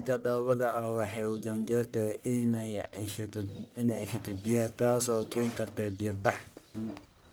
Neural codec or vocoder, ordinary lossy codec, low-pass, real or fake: codec, 44.1 kHz, 1.7 kbps, Pupu-Codec; none; none; fake